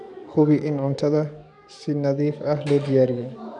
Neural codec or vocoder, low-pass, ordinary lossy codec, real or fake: codec, 44.1 kHz, 7.8 kbps, DAC; 10.8 kHz; none; fake